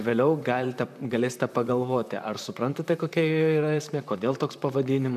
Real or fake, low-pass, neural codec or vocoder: fake; 14.4 kHz; vocoder, 44.1 kHz, 128 mel bands, Pupu-Vocoder